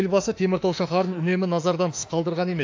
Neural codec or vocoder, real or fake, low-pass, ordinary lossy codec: autoencoder, 48 kHz, 32 numbers a frame, DAC-VAE, trained on Japanese speech; fake; 7.2 kHz; AAC, 48 kbps